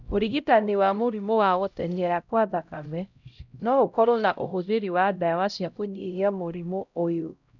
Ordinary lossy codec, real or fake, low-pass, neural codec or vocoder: none; fake; 7.2 kHz; codec, 16 kHz, 0.5 kbps, X-Codec, HuBERT features, trained on LibriSpeech